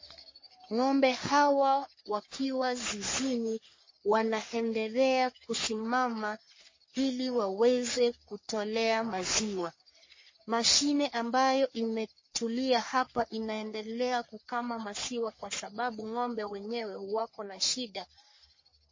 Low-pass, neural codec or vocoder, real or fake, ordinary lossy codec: 7.2 kHz; codec, 44.1 kHz, 3.4 kbps, Pupu-Codec; fake; MP3, 32 kbps